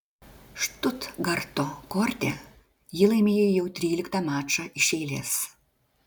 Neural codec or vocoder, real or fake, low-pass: none; real; 19.8 kHz